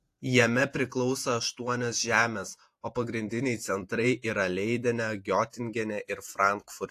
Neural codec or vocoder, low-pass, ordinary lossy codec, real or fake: vocoder, 48 kHz, 128 mel bands, Vocos; 14.4 kHz; AAC, 64 kbps; fake